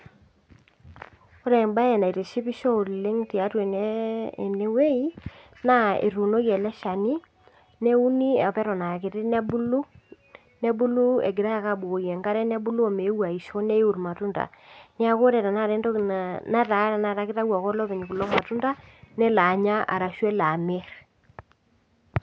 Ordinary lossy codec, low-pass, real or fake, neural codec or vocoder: none; none; real; none